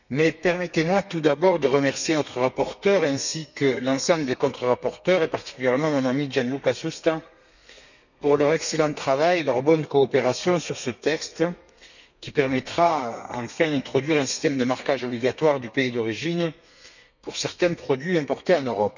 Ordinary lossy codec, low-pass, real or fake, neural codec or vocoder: none; 7.2 kHz; fake; codec, 32 kHz, 1.9 kbps, SNAC